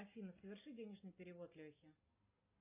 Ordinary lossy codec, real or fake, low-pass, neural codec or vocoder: AAC, 24 kbps; real; 3.6 kHz; none